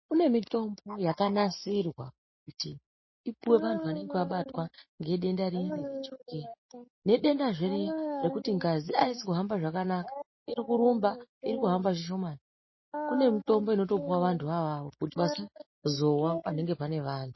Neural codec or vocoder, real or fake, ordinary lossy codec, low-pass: none; real; MP3, 24 kbps; 7.2 kHz